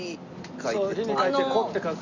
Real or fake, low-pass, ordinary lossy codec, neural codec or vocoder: real; 7.2 kHz; Opus, 64 kbps; none